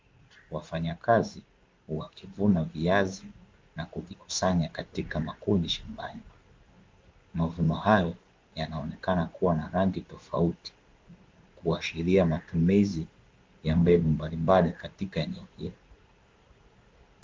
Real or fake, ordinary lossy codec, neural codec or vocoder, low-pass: fake; Opus, 32 kbps; codec, 16 kHz in and 24 kHz out, 1 kbps, XY-Tokenizer; 7.2 kHz